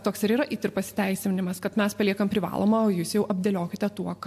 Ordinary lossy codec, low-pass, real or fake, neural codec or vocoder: MP3, 64 kbps; 14.4 kHz; real; none